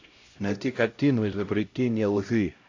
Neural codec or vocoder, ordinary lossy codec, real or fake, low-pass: codec, 16 kHz, 0.5 kbps, X-Codec, HuBERT features, trained on LibriSpeech; AAC, 48 kbps; fake; 7.2 kHz